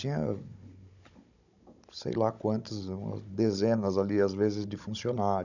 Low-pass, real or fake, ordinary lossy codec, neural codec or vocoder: 7.2 kHz; fake; none; codec, 16 kHz, 16 kbps, FunCodec, trained on Chinese and English, 50 frames a second